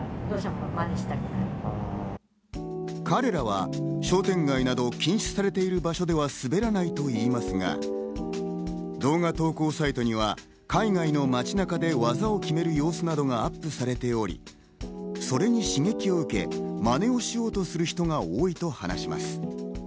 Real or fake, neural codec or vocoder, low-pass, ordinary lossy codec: real; none; none; none